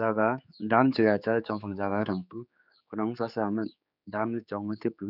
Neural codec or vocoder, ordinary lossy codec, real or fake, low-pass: codec, 16 kHz, 4 kbps, X-Codec, HuBERT features, trained on general audio; none; fake; 5.4 kHz